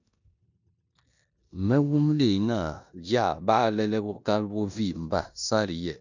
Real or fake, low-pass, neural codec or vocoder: fake; 7.2 kHz; codec, 16 kHz in and 24 kHz out, 0.9 kbps, LongCat-Audio-Codec, four codebook decoder